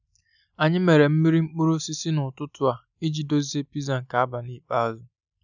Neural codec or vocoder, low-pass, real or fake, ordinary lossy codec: none; 7.2 kHz; real; none